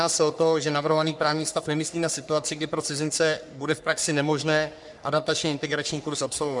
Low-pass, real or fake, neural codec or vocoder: 10.8 kHz; fake; codec, 44.1 kHz, 3.4 kbps, Pupu-Codec